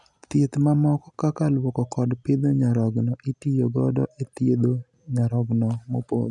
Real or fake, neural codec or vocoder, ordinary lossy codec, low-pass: real; none; none; 10.8 kHz